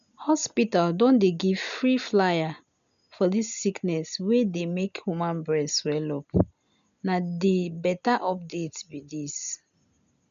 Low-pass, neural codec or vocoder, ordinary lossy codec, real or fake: 7.2 kHz; none; none; real